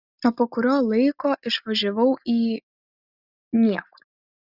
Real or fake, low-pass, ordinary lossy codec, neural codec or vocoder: real; 5.4 kHz; Opus, 64 kbps; none